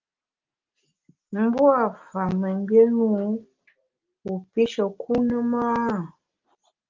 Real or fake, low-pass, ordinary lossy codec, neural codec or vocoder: real; 7.2 kHz; Opus, 32 kbps; none